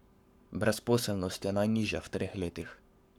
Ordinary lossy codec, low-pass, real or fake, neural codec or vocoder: none; 19.8 kHz; fake; codec, 44.1 kHz, 7.8 kbps, Pupu-Codec